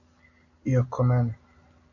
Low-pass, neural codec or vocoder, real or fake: 7.2 kHz; none; real